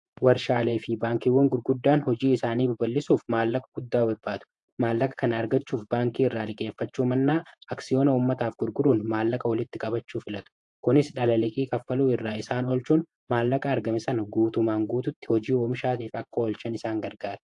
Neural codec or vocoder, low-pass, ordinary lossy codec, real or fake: vocoder, 48 kHz, 128 mel bands, Vocos; 10.8 kHz; AAC, 64 kbps; fake